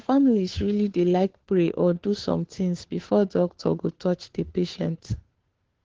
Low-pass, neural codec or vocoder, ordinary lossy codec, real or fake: 7.2 kHz; codec, 16 kHz, 2 kbps, FunCodec, trained on Chinese and English, 25 frames a second; Opus, 16 kbps; fake